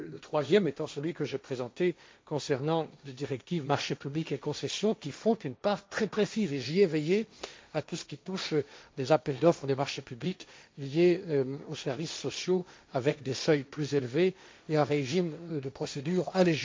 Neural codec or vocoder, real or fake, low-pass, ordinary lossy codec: codec, 16 kHz, 1.1 kbps, Voila-Tokenizer; fake; none; none